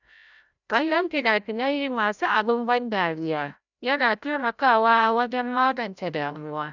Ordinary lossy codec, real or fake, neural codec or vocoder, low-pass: none; fake; codec, 16 kHz, 0.5 kbps, FreqCodec, larger model; 7.2 kHz